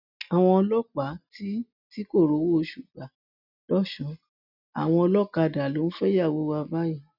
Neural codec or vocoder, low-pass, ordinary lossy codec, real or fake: none; 5.4 kHz; none; real